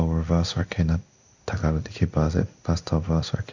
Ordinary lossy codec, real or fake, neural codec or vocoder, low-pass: none; fake; codec, 16 kHz in and 24 kHz out, 1 kbps, XY-Tokenizer; 7.2 kHz